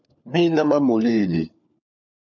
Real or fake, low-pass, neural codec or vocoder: fake; 7.2 kHz; codec, 16 kHz, 4 kbps, FunCodec, trained on LibriTTS, 50 frames a second